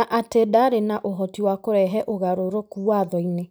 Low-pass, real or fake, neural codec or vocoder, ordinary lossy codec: none; real; none; none